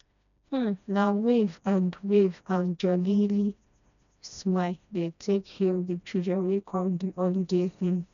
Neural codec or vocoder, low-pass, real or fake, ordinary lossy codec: codec, 16 kHz, 1 kbps, FreqCodec, smaller model; 7.2 kHz; fake; none